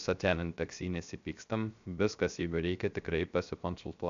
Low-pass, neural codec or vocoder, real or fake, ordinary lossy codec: 7.2 kHz; codec, 16 kHz, 0.3 kbps, FocalCodec; fake; MP3, 64 kbps